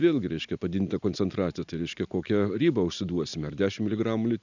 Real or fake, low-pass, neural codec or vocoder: real; 7.2 kHz; none